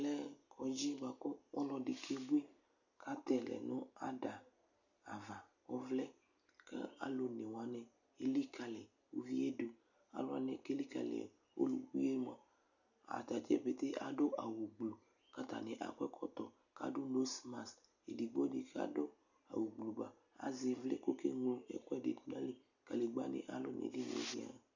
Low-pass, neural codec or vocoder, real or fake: 7.2 kHz; none; real